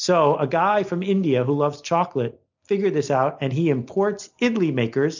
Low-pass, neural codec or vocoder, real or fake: 7.2 kHz; none; real